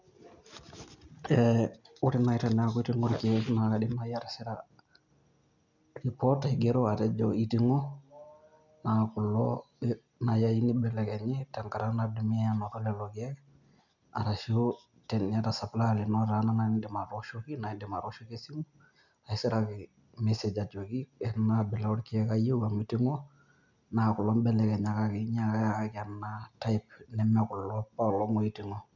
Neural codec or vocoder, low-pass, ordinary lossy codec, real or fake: none; 7.2 kHz; none; real